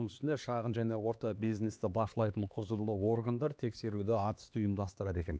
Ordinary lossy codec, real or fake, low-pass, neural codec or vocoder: none; fake; none; codec, 16 kHz, 2 kbps, X-Codec, HuBERT features, trained on LibriSpeech